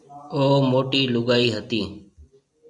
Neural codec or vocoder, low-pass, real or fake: none; 10.8 kHz; real